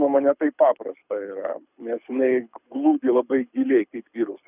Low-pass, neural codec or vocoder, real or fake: 3.6 kHz; codec, 24 kHz, 6 kbps, HILCodec; fake